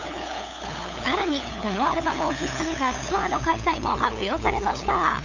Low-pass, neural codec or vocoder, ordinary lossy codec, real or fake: 7.2 kHz; codec, 16 kHz, 8 kbps, FunCodec, trained on LibriTTS, 25 frames a second; none; fake